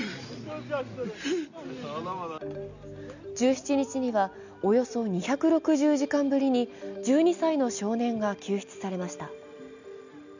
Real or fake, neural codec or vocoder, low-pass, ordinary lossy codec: real; none; 7.2 kHz; none